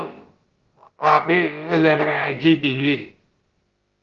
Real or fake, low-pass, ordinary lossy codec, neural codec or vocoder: fake; 7.2 kHz; Opus, 16 kbps; codec, 16 kHz, about 1 kbps, DyCAST, with the encoder's durations